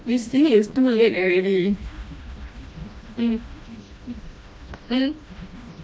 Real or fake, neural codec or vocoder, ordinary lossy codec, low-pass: fake; codec, 16 kHz, 1 kbps, FreqCodec, smaller model; none; none